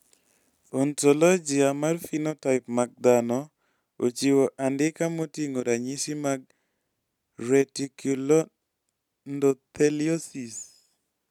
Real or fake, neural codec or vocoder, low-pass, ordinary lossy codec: real; none; 19.8 kHz; none